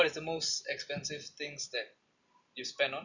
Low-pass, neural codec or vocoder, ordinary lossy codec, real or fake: 7.2 kHz; none; none; real